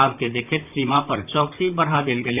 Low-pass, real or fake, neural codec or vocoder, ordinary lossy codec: 3.6 kHz; fake; vocoder, 44.1 kHz, 128 mel bands, Pupu-Vocoder; none